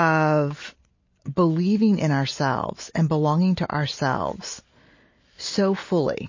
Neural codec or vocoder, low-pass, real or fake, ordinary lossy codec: none; 7.2 kHz; real; MP3, 32 kbps